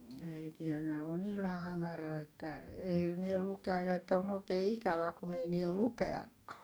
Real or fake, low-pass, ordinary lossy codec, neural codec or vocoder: fake; none; none; codec, 44.1 kHz, 2.6 kbps, DAC